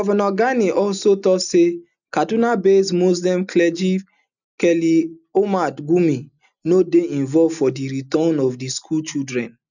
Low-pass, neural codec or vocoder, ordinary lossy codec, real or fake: 7.2 kHz; none; none; real